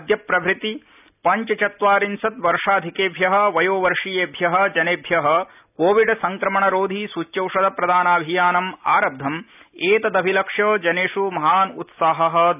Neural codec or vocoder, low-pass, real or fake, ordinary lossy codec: none; 3.6 kHz; real; none